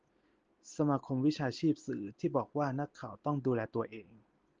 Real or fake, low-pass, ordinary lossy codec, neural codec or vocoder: real; 7.2 kHz; Opus, 16 kbps; none